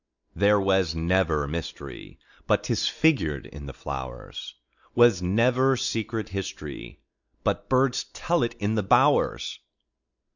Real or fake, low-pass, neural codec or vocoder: real; 7.2 kHz; none